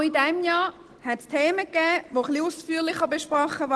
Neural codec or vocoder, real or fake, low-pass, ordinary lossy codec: none; real; 10.8 kHz; Opus, 16 kbps